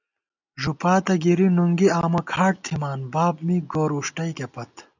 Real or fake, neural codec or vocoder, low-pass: real; none; 7.2 kHz